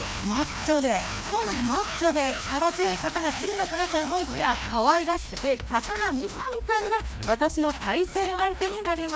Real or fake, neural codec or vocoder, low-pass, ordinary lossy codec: fake; codec, 16 kHz, 1 kbps, FreqCodec, larger model; none; none